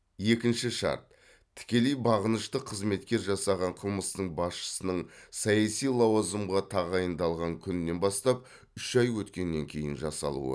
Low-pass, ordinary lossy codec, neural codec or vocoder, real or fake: none; none; none; real